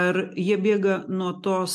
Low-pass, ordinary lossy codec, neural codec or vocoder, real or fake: 14.4 kHz; MP3, 64 kbps; none; real